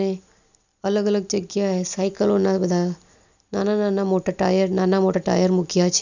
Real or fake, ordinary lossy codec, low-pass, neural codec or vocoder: real; none; 7.2 kHz; none